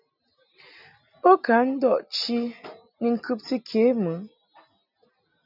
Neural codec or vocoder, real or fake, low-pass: none; real; 5.4 kHz